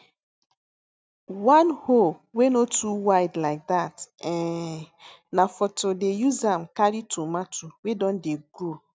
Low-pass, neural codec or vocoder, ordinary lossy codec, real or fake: none; none; none; real